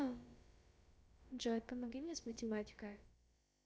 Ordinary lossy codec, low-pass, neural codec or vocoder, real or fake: none; none; codec, 16 kHz, about 1 kbps, DyCAST, with the encoder's durations; fake